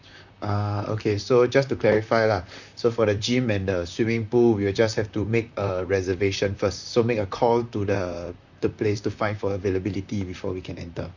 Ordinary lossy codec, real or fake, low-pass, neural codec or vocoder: none; fake; 7.2 kHz; vocoder, 44.1 kHz, 128 mel bands, Pupu-Vocoder